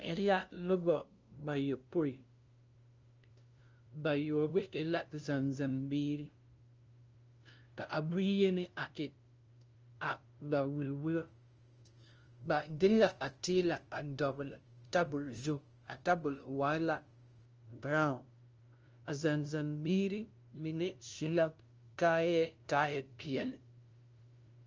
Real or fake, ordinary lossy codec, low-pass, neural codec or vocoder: fake; Opus, 24 kbps; 7.2 kHz; codec, 16 kHz, 0.5 kbps, FunCodec, trained on LibriTTS, 25 frames a second